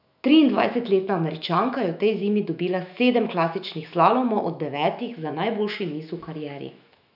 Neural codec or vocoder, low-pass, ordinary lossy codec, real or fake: autoencoder, 48 kHz, 128 numbers a frame, DAC-VAE, trained on Japanese speech; 5.4 kHz; none; fake